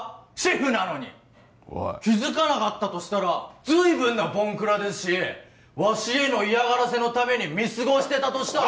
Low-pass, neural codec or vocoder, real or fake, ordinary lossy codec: none; none; real; none